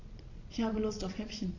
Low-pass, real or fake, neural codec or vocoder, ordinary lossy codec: 7.2 kHz; fake; vocoder, 22.05 kHz, 80 mel bands, Vocos; Opus, 64 kbps